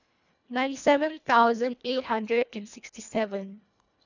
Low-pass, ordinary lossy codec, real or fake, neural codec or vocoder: 7.2 kHz; none; fake; codec, 24 kHz, 1.5 kbps, HILCodec